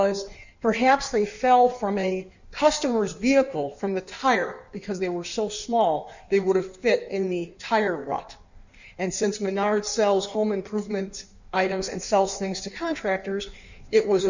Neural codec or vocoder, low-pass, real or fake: codec, 16 kHz in and 24 kHz out, 1.1 kbps, FireRedTTS-2 codec; 7.2 kHz; fake